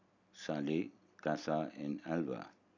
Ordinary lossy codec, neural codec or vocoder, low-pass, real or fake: none; none; 7.2 kHz; real